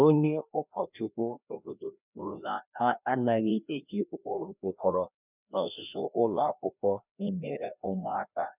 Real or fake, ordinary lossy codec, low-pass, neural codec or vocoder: fake; none; 3.6 kHz; codec, 16 kHz, 1 kbps, FreqCodec, larger model